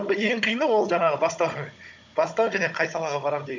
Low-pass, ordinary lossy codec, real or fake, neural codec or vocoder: 7.2 kHz; MP3, 64 kbps; fake; codec, 16 kHz, 16 kbps, FunCodec, trained on Chinese and English, 50 frames a second